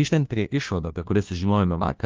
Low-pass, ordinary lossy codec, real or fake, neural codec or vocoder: 7.2 kHz; Opus, 32 kbps; fake; codec, 16 kHz, 1 kbps, FunCodec, trained on LibriTTS, 50 frames a second